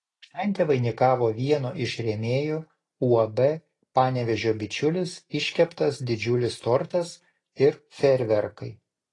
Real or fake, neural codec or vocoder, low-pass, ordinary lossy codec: real; none; 10.8 kHz; AAC, 32 kbps